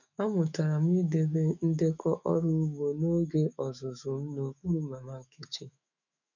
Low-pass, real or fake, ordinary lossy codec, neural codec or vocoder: 7.2 kHz; fake; none; autoencoder, 48 kHz, 128 numbers a frame, DAC-VAE, trained on Japanese speech